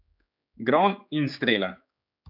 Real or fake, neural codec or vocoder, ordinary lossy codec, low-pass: fake; codec, 16 kHz, 4 kbps, X-Codec, HuBERT features, trained on general audio; none; 5.4 kHz